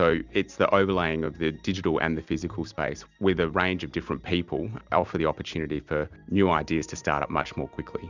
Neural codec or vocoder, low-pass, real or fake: none; 7.2 kHz; real